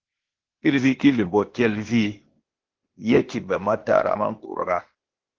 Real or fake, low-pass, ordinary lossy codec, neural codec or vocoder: fake; 7.2 kHz; Opus, 16 kbps; codec, 16 kHz, 0.8 kbps, ZipCodec